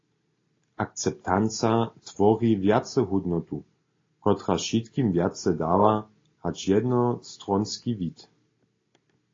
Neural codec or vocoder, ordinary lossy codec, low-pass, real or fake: none; AAC, 32 kbps; 7.2 kHz; real